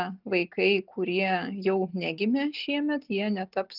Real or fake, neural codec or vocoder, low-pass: real; none; 5.4 kHz